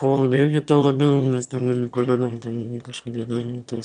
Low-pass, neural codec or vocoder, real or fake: 9.9 kHz; autoencoder, 22.05 kHz, a latent of 192 numbers a frame, VITS, trained on one speaker; fake